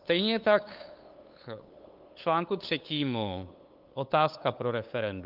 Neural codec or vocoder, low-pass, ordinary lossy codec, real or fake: codec, 16 kHz, 8 kbps, FunCodec, trained on LibriTTS, 25 frames a second; 5.4 kHz; Opus, 24 kbps; fake